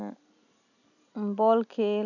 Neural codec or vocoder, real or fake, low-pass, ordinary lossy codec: none; real; 7.2 kHz; none